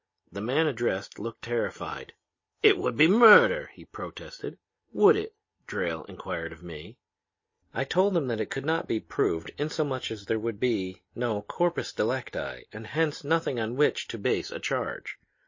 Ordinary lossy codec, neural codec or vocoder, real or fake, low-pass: MP3, 32 kbps; none; real; 7.2 kHz